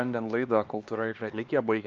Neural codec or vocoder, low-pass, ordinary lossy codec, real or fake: codec, 16 kHz, 2 kbps, X-Codec, HuBERT features, trained on LibriSpeech; 7.2 kHz; Opus, 24 kbps; fake